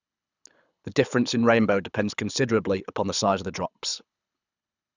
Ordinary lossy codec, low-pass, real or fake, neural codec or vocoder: none; 7.2 kHz; fake; codec, 24 kHz, 6 kbps, HILCodec